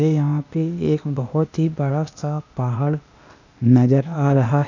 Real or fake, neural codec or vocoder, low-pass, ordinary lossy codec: fake; codec, 16 kHz, 0.8 kbps, ZipCodec; 7.2 kHz; none